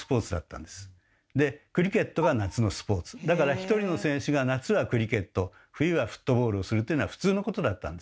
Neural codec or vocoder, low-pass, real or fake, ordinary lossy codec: none; none; real; none